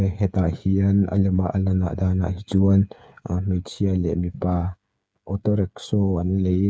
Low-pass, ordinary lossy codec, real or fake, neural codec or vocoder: none; none; fake; codec, 16 kHz, 8 kbps, FreqCodec, smaller model